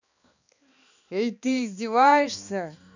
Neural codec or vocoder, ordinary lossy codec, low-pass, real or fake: codec, 16 kHz, 2 kbps, X-Codec, HuBERT features, trained on balanced general audio; none; 7.2 kHz; fake